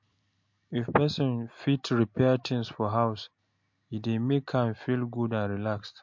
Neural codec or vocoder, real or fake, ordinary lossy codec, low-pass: none; real; MP3, 48 kbps; 7.2 kHz